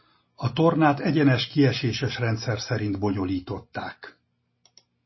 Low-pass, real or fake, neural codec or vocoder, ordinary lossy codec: 7.2 kHz; real; none; MP3, 24 kbps